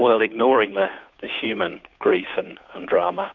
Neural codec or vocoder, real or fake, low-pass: codec, 16 kHz, 2 kbps, FunCodec, trained on Chinese and English, 25 frames a second; fake; 7.2 kHz